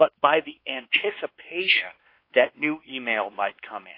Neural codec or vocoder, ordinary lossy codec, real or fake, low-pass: codec, 16 kHz, 2 kbps, X-Codec, WavLM features, trained on Multilingual LibriSpeech; AAC, 32 kbps; fake; 5.4 kHz